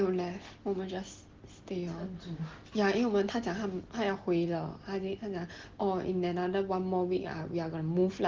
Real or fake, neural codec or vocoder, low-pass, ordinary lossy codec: real; none; 7.2 kHz; Opus, 16 kbps